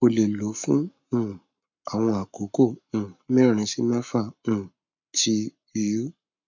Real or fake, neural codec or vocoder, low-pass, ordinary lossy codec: fake; codec, 44.1 kHz, 7.8 kbps, Pupu-Codec; 7.2 kHz; MP3, 64 kbps